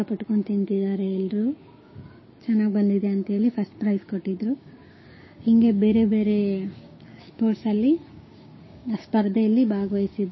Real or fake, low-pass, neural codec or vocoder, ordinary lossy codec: fake; 7.2 kHz; codec, 16 kHz, 6 kbps, DAC; MP3, 24 kbps